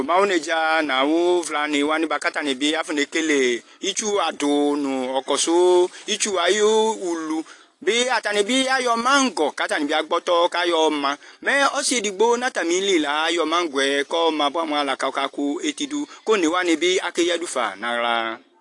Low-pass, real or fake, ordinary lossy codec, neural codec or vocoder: 10.8 kHz; real; AAC, 48 kbps; none